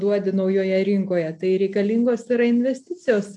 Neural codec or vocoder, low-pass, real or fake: none; 10.8 kHz; real